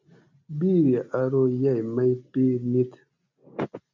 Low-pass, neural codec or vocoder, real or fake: 7.2 kHz; none; real